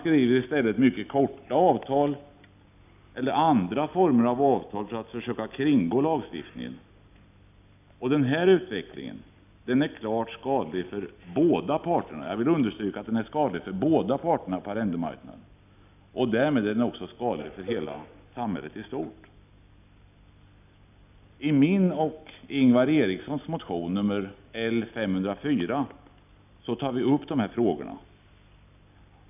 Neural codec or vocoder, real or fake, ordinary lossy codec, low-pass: none; real; none; 3.6 kHz